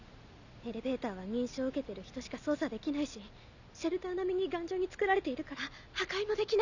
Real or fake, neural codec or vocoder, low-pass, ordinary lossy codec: real; none; 7.2 kHz; none